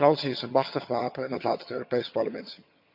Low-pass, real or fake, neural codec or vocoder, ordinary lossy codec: 5.4 kHz; fake; vocoder, 22.05 kHz, 80 mel bands, HiFi-GAN; MP3, 48 kbps